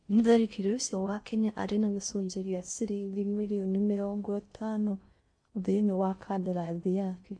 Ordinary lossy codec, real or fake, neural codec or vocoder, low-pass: MP3, 48 kbps; fake; codec, 16 kHz in and 24 kHz out, 0.6 kbps, FocalCodec, streaming, 4096 codes; 9.9 kHz